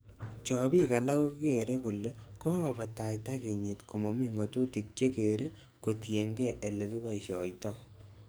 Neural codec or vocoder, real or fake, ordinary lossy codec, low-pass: codec, 44.1 kHz, 2.6 kbps, SNAC; fake; none; none